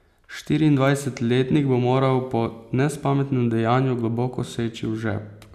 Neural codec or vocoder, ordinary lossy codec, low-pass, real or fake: none; none; 14.4 kHz; real